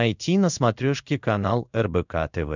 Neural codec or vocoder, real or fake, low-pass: codec, 16 kHz in and 24 kHz out, 1 kbps, XY-Tokenizer; fake; 7.2 kHz